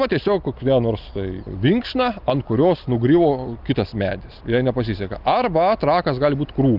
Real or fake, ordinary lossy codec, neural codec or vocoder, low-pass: real; Opus, 24 kbps; none; 5.4 kHz